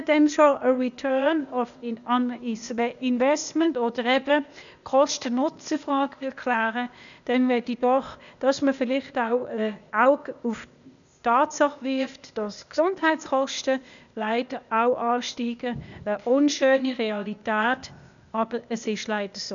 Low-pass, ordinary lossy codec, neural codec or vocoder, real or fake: 7.2 kHz; none; codec, 16 kHz, 0.8 kbps, ZipCodec; fake